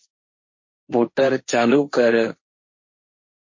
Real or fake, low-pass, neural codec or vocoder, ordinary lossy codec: fake; 7.2 kHz; codec, 16 kHz, 1.1 kbps, Voila-Tokenizer; MP3, 32 kbps